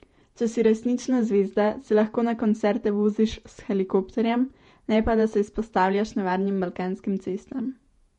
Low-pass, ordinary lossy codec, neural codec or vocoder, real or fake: 19.8 kHz; MP3, 48 kbps; vocoder, 48 kHz, 128 mel bands, Vocos; fake